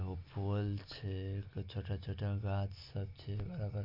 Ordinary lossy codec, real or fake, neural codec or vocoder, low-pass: AAC, 32 kbps; real; none; 5.4 kHz